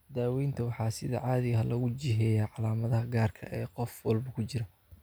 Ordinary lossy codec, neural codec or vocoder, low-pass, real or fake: none; none; none; real